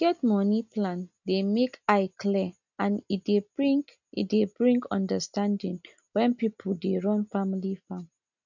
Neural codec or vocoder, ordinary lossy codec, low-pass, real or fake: none; none; 7.2 kHz; real